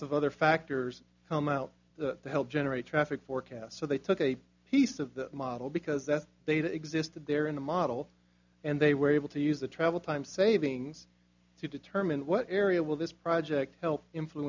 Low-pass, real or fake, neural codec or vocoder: 7.2 kHz; real; none